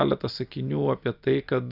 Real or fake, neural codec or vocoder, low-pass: real; none; 5.4 kHz